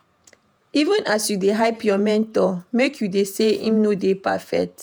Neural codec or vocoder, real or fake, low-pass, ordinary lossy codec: vocoder, 48 kHz, 128 mel bands, Vocos; fake; none; none